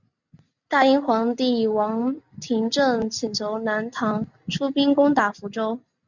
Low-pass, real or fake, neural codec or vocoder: 7.2 kHz; real; none